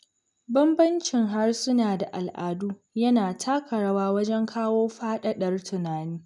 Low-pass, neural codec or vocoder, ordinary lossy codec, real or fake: 10.8 kHz; none; none; real